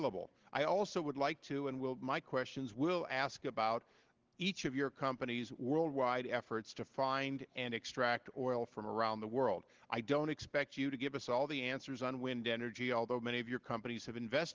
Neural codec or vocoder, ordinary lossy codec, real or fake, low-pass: none; Opus, 16 kbps; real; 7.2 kHz